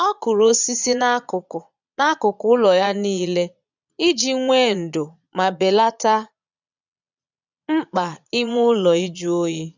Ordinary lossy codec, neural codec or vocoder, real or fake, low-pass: none; vocoder, 22.05 kHz, 80 mel bands, Vocos; fake; 7.2 kHz